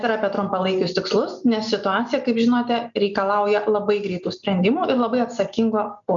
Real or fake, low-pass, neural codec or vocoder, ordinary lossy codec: real; 7.2 kHz; none; MP3, 96 kbps